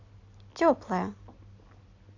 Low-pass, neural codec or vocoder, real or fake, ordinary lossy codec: 7.2 kHz; none; real; AAC, 48 kbps